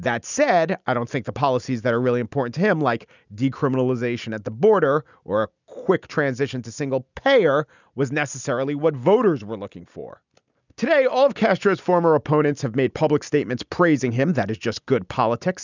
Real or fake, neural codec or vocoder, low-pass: real; none; 7.2 kHz